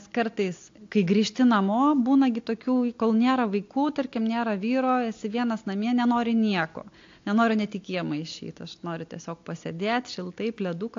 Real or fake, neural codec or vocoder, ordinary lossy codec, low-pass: real; none; AAC, 48 kbps; 7.2 kHz